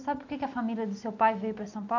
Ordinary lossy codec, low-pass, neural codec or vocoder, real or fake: none; 7.2 kHz; none; real